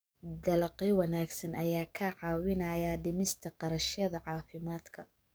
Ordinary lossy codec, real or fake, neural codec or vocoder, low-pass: none; fake; codec, 44.1 kHz, 7.8 kbps, DAC; none